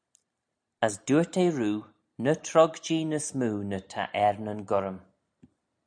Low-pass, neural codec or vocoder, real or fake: 9.9 kHz; none; real